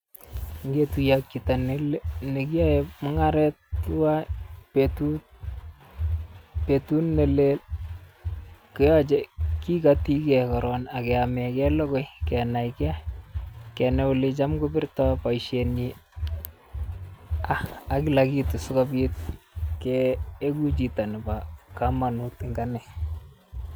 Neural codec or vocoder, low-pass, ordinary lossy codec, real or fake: none; none; none; real